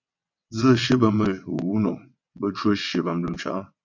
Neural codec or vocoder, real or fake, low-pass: vocoder, 22.05 kHz, 80 mel bands, WaveNeXt; fake; 7.2 kHz